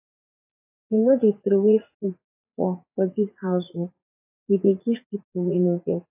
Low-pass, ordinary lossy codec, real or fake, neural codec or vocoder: 3.6 kHz; AAC, 32 kbps; fake; vocoder, 44.1 kHz, 80 mel bands, Vocos